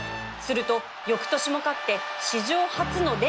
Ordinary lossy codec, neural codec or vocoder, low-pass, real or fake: none; none; none; real